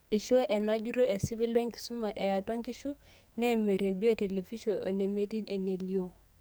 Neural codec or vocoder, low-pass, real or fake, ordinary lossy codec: codec, 44.1 kHz, 2.6 kbps, SNAC; none; fake; none